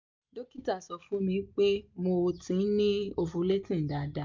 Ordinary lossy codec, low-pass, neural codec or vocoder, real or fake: MP3, 64 kbps; 7.2 kHz; none; real